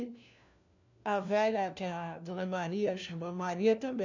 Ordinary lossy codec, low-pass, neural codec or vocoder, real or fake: none; 7.2 kHz; codec, 16 kHz, 1 kbps, FunCodec, trained on LibriTTS, 50 frames a second; fake